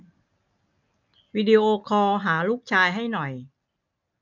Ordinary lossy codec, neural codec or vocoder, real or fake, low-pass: none; none; real; 7.2 kHz